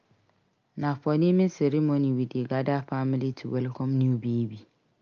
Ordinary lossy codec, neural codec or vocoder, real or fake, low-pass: Opus, 24 kbps; none; real; 7.2 kHz